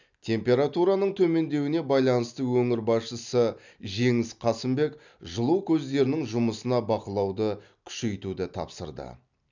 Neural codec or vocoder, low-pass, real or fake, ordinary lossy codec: none; 7.2 kHz; real; none